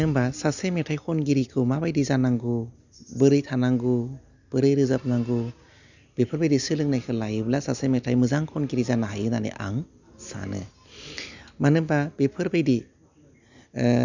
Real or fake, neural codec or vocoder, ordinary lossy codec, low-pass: real; none; none; 7.2 kHz